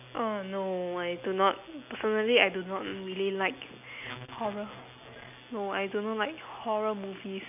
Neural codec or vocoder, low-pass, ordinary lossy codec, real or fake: none; 3.6 kHz; none; real